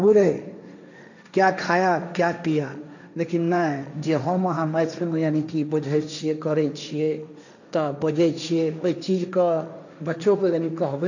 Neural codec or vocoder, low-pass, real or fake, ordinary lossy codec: codec, 16 kHz, 1.1 kbps, Voila-Tokenizer; 7.2 kHz; fake; none